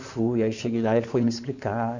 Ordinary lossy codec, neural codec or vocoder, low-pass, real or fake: none; codec, 16 kHz in and 24 kHz out, 2.2 kbps, FireRedTTS-2 codec; 7.2 kHz; fake